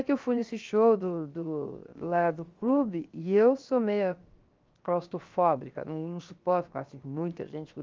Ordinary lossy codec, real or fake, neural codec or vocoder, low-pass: Opus, 32 kbps; fake; codec, 16 kHz, 0.7 kbps, FocalCodec; 7.2 kHz